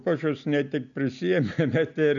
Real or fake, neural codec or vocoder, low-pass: real; none; 7.2 kHz